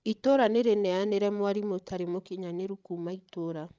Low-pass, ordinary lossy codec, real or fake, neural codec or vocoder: none; none; fake; codec, 16 kHz, 16 kbps, FreqCodec, larger model